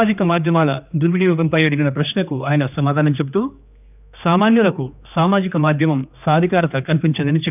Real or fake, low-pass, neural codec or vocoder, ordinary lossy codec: fake; 3.6 kHz; codec, 16 kHz, 2 kbps, X-Codec, HuBERT features, trained on general audio; none